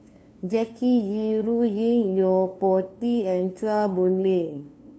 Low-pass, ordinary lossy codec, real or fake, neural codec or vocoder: none; none; fake; codec, 16 kHz, 2 kbps, FunCodec, trained on LibriTTS, 25 frames a second